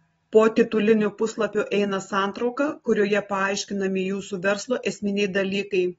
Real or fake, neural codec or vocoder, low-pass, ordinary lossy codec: real; none; 19.8 kHz; AAC, 24 kbps